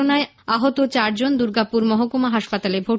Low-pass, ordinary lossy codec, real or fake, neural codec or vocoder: none; none; real; none